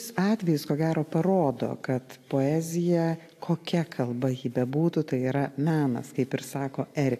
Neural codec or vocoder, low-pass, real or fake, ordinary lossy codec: none; 14.4 kHz; real; AAC, 64 kbps